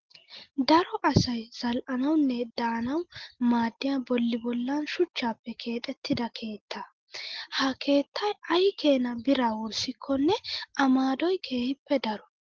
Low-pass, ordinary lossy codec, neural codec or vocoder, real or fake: 7.2 kHz; Opus, 16 kbps; none; real